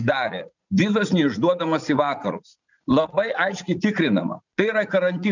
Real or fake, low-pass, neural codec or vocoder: fake; 7.2 kHz; vocoder, 44.1 kHz, 80 mel bands, Vocos